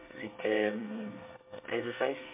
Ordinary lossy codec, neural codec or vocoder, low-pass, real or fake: MP3, 24 kbps; codec, 24 kHz, 1 kbps, SNAC; 3.6 kHz; fake